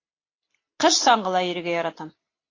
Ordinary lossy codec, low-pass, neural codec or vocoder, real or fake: AAC, 32 kbps; 7.2 kHz; none; real